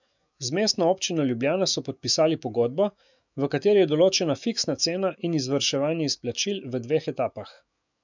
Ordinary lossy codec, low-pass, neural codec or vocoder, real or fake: none; 7.2 kHz; autoencoder, 48 kHz, 128 numbers a frame, DAC-VAE, trained on Japanese speech; fake